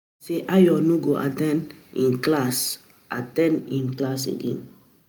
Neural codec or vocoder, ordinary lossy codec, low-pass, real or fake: none; none; none; real